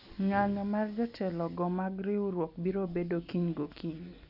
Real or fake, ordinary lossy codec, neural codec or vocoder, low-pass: real; none; none; 5.4 kHz